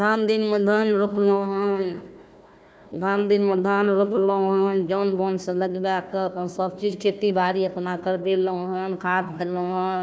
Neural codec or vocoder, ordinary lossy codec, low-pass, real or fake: codec, 16 kHz, 1 kbps, FunCodec, trained on Chinese and English, 50 frames a second; none; none; fake